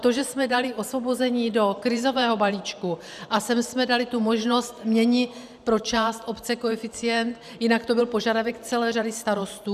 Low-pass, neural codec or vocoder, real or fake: 14.4 kHz; vocoder, 44.1 kHz, 128 mel bands every 512 samples, BigVGAN v2; fake